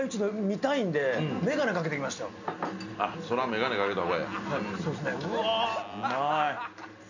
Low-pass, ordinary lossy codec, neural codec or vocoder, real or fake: 7.2 kHz; none; none; real